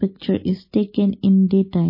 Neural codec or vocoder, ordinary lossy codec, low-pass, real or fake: none; MP3, 24 kbps; 5.4 kHz; real